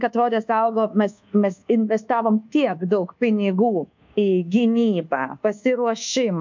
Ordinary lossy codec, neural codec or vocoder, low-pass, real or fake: MP3, 64 kbps; codec, 24 kHz, 1.2 kbps, DualCodec; 7.2 kHz; fake